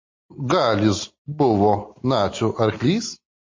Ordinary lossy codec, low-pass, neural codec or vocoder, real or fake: MP3, 32 kbps; 7.2 kHz; none; real